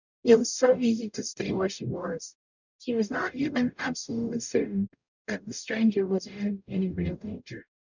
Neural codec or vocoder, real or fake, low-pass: codec, 44.1 kHz, 0.9 kbps, DAC; fake; 7.2 kHz